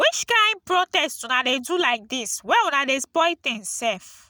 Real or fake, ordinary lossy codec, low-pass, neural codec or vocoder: real; none; none; none